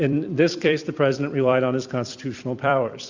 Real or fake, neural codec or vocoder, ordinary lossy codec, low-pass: fake; vocoder, 44.1 kHz, 128 mel bands every 256 samples, BigVGAN v2; Opus, 64 kbps; 7.2 kHz